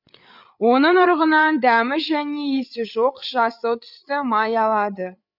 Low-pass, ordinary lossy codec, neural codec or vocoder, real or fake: 5.4 kHz; none; codec, 16 kHz, 8 kbps, FreqCodec, larger model; fake